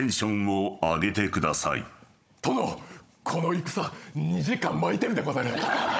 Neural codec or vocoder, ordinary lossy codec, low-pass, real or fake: codec, 16 kHz, 16 kbps, FunCodec, trained on Chinese and English, 50 frames a second; none; none; fake